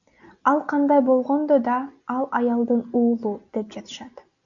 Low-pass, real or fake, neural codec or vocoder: 7.2 kHz; real; none